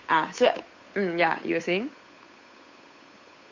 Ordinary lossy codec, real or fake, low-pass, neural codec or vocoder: MP3, 48 kbps; fake; 7.2 kHz; codec, 16 kHz, 8 kbps, FunCodec, trained on Chinese and English, 25 frames a second